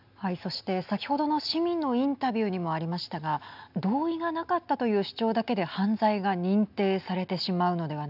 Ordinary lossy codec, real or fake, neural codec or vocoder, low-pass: none; real; none; 5.4 kHz